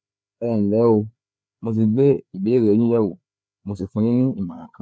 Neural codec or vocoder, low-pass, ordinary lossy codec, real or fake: codec, 16 kHz, 4 kbps, FreqCodec, larger model; none; none; fake